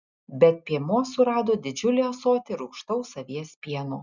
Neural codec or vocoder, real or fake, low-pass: none; real; 7.2 kHz